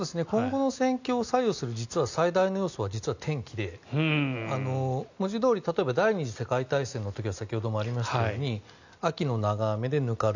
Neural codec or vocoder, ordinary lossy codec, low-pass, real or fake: none; none; 7.2 kHz; real